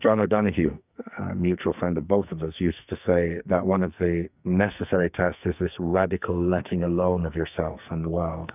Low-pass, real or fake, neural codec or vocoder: 3.6 kHz; fake; codec, 44.1 kHz, 2.6 kbps, SNAC